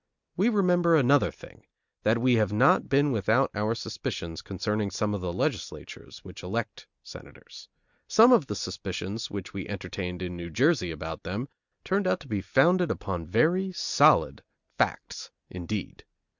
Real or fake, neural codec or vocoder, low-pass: real; none; 7.2 kHz